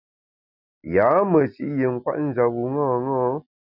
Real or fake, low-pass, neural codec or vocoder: real; 5.4 kHz; none